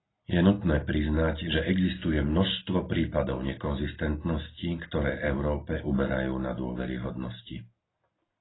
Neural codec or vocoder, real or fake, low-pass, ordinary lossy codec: none; real; 7.2 kHz; AAC, 16 kbps